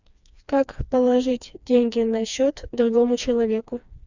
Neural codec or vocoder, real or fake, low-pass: codec, 16 kHz, 2 kbps, FreqCodec, smaller model; fake; 7.2 kHz